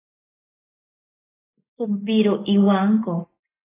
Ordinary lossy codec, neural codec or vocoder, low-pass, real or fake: AAC, 16 kbps; none; 3.6 kHz; real